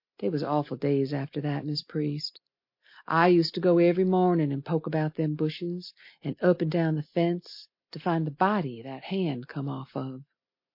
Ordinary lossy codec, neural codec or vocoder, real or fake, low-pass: MP3, 32 kbps; none; real; 5.4 kHz